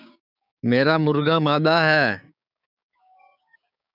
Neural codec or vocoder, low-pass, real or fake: codec, 16 kHz, 6 kbps, DAC; 5.4 kHz; fake